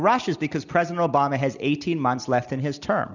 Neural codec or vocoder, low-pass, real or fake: none; 7.2 kHz; real